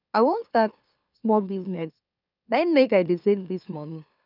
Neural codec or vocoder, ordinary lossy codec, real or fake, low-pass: autoencoder, 44.1 kHz, a latent of 192 numbers a frame, MeloTTS; none; fake; 5.4 kHz